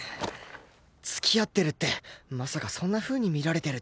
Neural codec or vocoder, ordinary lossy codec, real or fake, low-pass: none; none; real; none